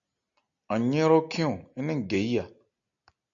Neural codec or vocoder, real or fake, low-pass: none; real; 7.2 kHz